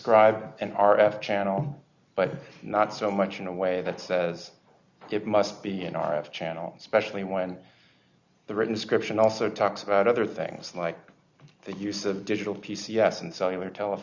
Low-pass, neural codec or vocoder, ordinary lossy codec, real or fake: 7.2 kHz; none; Opus, 64 kbps; real